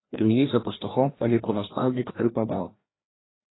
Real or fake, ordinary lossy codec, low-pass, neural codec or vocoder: fake; AAC, 16 kbps; 7.2 kHz; codec, 16 kHz, 1 kbps, FreqCodec, larger model